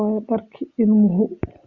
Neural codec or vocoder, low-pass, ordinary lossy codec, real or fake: none; 7.2 kHz; Opus, 64 kbps; real